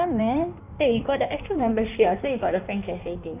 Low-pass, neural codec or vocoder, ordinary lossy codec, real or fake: 3.6 kHz; codec, 16 kHz in and 24 kHz out, 1.1 kbps, FireRedTTS-2 codec; none; fake